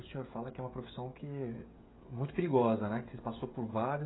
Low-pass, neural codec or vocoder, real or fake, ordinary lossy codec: 7.2 kHz; codec, 16 kHz, 16 kbps, FreqCodec, smaller model; fake; AAC, 16 kbps